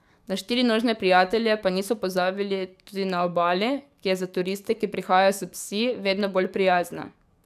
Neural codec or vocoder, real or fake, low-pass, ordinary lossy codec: codec, 44.1 kHz, 7.8 kbps, DAC; fake; 14.4 kHz; none